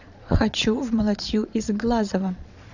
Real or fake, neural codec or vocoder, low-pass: fake; vocoder, 22.05 kHz, 80 mel bands, Vocos; 7.2 kHz